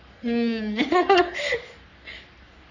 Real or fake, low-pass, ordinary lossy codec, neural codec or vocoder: fake; 7.2 kHz; none; codec, 44.1 kHz, 3.4 kbps, Pupu-Codec